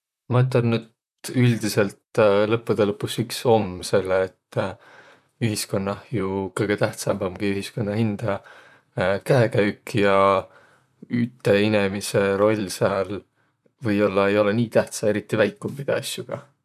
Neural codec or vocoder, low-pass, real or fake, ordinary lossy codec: vocoder, 44.1 kHz, 128 mel bands, Pupu-Vocoder; 14.4 kHz; fake; none